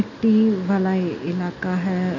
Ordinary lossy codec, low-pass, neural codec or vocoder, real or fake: none; 7.2 kHz; none; real